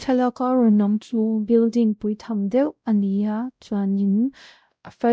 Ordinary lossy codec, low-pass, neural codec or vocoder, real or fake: none; none; codec, 16 kHz, 0.5 kbps, X-Codec, WavLM features, trained on Multilingual LibriSpeech; fake